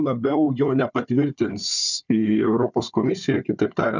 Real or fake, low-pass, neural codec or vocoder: fake; 7.2 kHz; codec, 16 kHz, 4 kbps, FunCodec, trained on Chinese and English, 50 frames a second